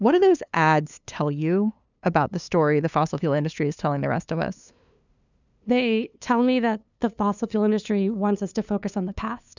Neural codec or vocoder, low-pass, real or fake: codec, 16 kHz, 2 kbps, FunCodec, trained on LibriTTS, 25 frames a second; 7.2 kHz; fake